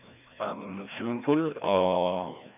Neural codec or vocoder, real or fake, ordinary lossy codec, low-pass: codec, 16 kHz, 1 kbps, FreqCodec, larger model; fake; none; 3.6 kHz